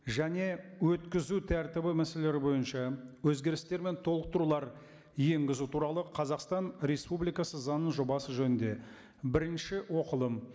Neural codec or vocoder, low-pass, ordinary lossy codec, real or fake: none; none; none; real